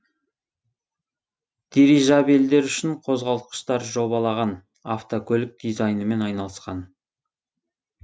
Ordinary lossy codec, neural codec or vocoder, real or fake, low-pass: none; none; real; none